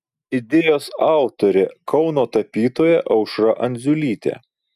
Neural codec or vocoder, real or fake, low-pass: none; real; 14.4 kHz